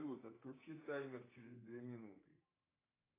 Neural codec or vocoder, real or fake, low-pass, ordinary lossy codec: codec, 24 kHz, 3.1 kbps, DualCodec; fake; 3.6 kHz; AAC, 16 kbps